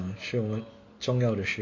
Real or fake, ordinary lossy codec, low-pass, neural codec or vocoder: real; MP3, 32 kbps; 7.2 kHz; none